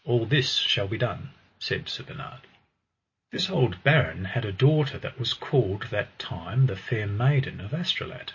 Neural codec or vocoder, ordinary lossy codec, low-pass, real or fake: none; MP3, 32 kbps; 7.2 kHz; real